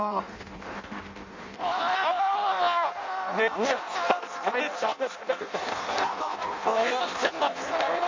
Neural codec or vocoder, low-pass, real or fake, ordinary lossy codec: codec, 16 kHz in and 24 kHz out, 0.6 kbps, FireRedTTS-2 codec; 7.2 kHz; fake; MP3, 48 kbps